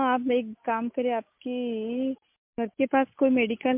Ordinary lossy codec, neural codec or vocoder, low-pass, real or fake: MP3, 32 kbps; none; 3.6 kHz; real